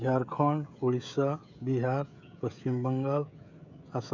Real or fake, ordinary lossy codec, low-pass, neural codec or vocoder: fake; none; 7.2 kHz; codec, 16 kHz, 16 kbps, FreqCodec, smaller model